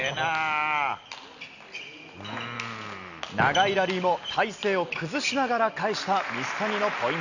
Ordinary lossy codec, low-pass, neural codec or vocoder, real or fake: none; 7.2 kHz; none; real